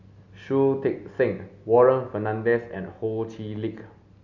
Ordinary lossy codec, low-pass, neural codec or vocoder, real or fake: none; 7.2 kHz; none; real